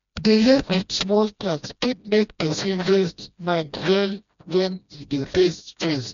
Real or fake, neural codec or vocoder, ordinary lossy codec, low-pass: fake; codec, 16 kHz, 1 kbps, FreqCodec, smaller model; MP3, 64 kbps; 7.2 kHz